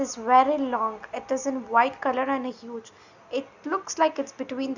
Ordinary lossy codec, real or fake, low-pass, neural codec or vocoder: none; real; 7.2 kHz; none